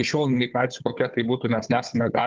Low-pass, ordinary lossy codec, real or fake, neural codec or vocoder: 7.2 kHz; Opus, 32 kbps; fake; codec, 16 kHz, 4 kbps, FunCodec, trained on Chinese and English, 50 frames a second